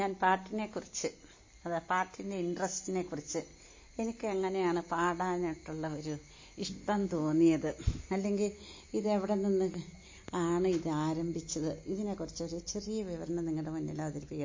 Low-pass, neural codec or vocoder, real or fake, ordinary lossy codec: 7.2 kHz; none; real; MP3, 32 kbps